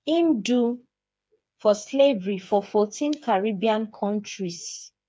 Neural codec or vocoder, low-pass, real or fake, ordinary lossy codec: codec, 16 kHz, 4 kbps, FreqCodec, smaller model; none; fake; none